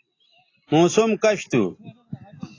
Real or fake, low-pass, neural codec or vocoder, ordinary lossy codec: real; 7.2 kHz; none; AAC, 32 kbps